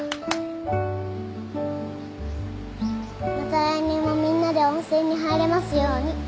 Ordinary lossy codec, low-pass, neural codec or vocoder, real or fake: none; none; none; real